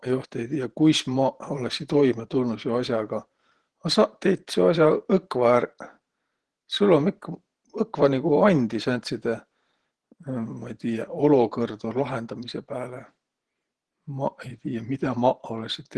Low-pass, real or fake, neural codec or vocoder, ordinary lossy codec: 10.8 kHz; fake; vocoder, 48 kHz, 128 mel bands, Vocos; Opus, 16 kbps